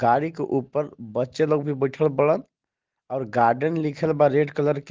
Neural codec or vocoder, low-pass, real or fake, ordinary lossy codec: none; 7.2 kHz; real; Opus, 16 kbps